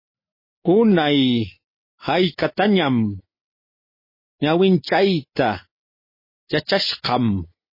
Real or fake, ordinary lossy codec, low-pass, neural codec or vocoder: real; MP3, 24 kbps; 5.4 kHz; none